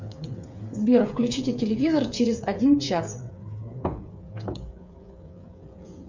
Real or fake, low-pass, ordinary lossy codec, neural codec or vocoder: fake; 7.2 kHz; MP3, 64 kbps; codec, 16 kHz, 8 kbps, FreqCodec, smaller model